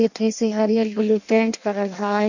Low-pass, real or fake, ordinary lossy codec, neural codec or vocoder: 7.2 kHz; fake; none; codec, 16 kHz in and 24 kHz out, 0.6 kbps, FireRedTTS-2 codec